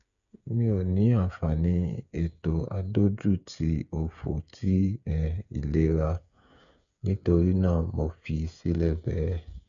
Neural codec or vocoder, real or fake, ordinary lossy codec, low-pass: codec, 16 kHz, 8 kbps, FreqCodec, smaller model; fake; none; 7.2 kHz